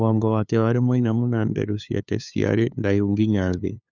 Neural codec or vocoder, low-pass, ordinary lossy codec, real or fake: codec, 16 kHz, 2 kbps, FunCodec, trained on LibriTTS, 25 frames a second; 7.2 kHz; none; fake